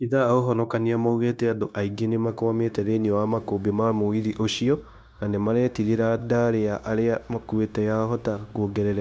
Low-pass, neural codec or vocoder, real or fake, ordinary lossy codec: none; codec, 16 kHz, 0.9 kbps, LongCat-Audio-Codec; fake; none